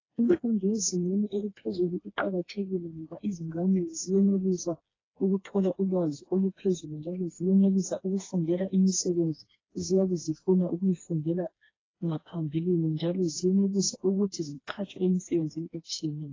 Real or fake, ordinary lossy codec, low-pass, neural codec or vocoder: fake; AAC, 32 kbps; 7.2 kHz; codec, 16 kHz, 2 kbps, FreqCodec, smaller model